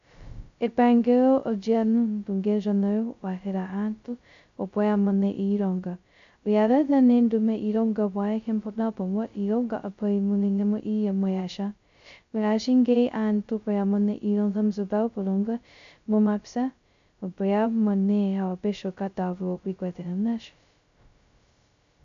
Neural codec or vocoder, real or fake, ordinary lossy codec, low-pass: codec, 16 kHz, 0.2 kbps, FocalCodec; fake; AAC, 64 kbps; 7.2 kHz